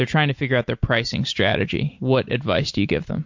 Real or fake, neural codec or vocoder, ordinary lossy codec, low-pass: real; none; MP3, 48 kbps; 7.2 kHz